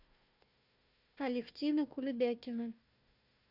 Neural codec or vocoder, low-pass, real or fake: codec, 16 kHz, 1 kbps, FunCodec, trained on Chinese and English, 50 frames a second; 5.4 kHz; fake